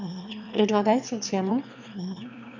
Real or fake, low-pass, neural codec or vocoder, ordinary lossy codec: fake; 7.2 kHz; autoencoder, 22.05 kHz, a latent of 192 numbers a frame, VITS, trained on one speaker; none